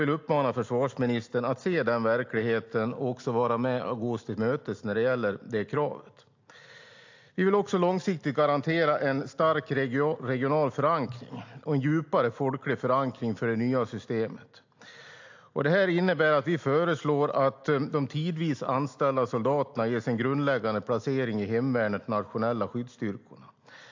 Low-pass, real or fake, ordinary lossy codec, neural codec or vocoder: 7.2 kHz; real; AAC, 48 kbps; none